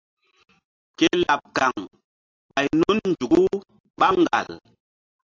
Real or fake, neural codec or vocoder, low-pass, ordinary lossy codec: real; none; 7.2 kHz; AAC, 32 kbps